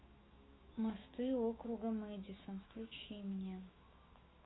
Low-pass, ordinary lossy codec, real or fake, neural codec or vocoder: 7.2 kHz; AAC, 16 kbps; fake; autoencoder, 48 kHz, 128 numbers a frame, DAC-VAE, trained on Japanese speech